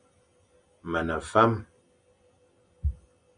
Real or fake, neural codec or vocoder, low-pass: real; none; 9.9 kHz